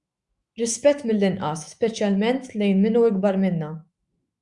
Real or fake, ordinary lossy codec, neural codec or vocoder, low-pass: fake; Opus, 64 kbps; autoencoder, 48 kHz, 128 numbers a frame, DAC-VAE, trained on Japanese speech; 10.8 kHz